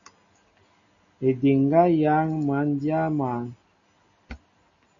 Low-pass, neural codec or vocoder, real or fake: 7.2 kHz; none; real